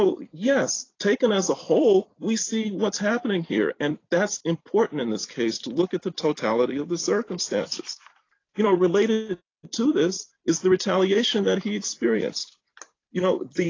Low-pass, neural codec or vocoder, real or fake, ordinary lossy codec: 7.2 kHz; vocoder, 44.1 kHz, 128 mel bands every 256 samples, BigVGAN v2; fake; AAC, 32 kbps